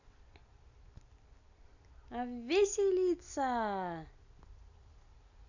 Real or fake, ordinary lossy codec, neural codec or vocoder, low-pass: real; none; none; 7.2 kHz